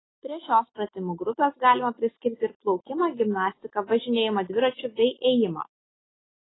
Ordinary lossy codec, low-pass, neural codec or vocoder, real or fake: AAC, 16 kbps; 7.2 kHz; none; real